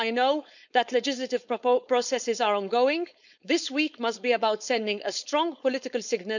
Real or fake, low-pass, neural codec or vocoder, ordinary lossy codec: fake; 7.2 kHz; codec, 16 kHz, 4.8 kbps, FACodec; none